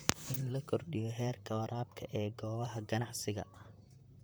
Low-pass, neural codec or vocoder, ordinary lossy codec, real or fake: none; vocoder, 44.1 kHz, 128 mel bands, Pupu-Vocoder; none; fake